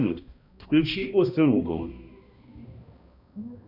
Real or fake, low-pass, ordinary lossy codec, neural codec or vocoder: fake; 5.4 kHz; MP3, 48 kbps; codec, 16 kHz, 1 kbps, X-Codec, HuBERT features, trained on balanced general audio